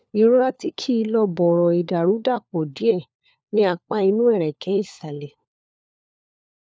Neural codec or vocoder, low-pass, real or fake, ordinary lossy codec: codec, 16 kHz, 4 kbps, FunCodec, trained on LibriTTS, 50 frames a second; none; fake; none